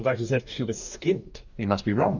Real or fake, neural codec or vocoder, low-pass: fake; codec, 44.1 kHz, 2.6 kbps, DAC; 7.2 kHz